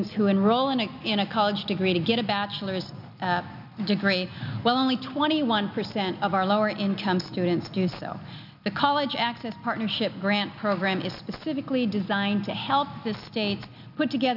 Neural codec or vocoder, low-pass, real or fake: none; 5.4 kHz; real